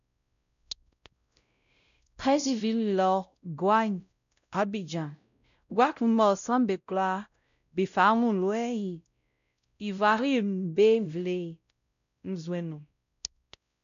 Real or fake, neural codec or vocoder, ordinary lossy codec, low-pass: fake; codec, 16 kHz, 0.5 kbps, X-Codec, WavLM features, trained on Multilingual LibriSpeech; none; 7.2 kHz